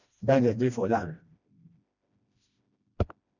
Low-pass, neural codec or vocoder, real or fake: 7.2 kHz; codec, 16 kHz, 1 kbps, FreqCodec, smaller model; fake